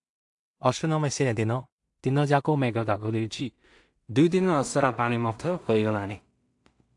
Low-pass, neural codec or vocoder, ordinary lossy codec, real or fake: 10.8 kHz; codec, 16 kHz in and 24 kHz out, 0.4 kbps, LongCat-Audio-Codec, two codebook decoder; AAC, 64 kbps; fake